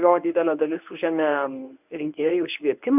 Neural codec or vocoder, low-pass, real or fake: codec, 24 kHz, 0.9 kbps, WavTokenizer, medium speech release version 1; 3.6 kHz; fake